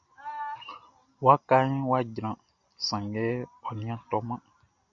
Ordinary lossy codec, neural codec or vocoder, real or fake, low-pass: AAC, 64 kbps; none; real; 7.2 kHz